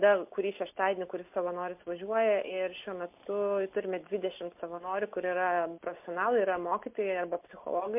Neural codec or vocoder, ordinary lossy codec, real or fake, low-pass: none; MP3, 32 kbps; real; 3.6 kHz